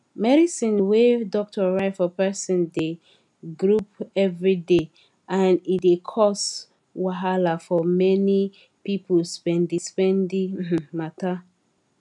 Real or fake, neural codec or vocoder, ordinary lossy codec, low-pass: real; none; none; 10.8 kHz